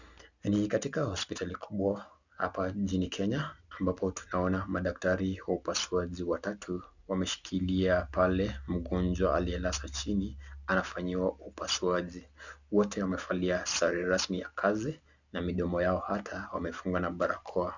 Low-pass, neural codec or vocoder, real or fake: 7.2 kHz; none; real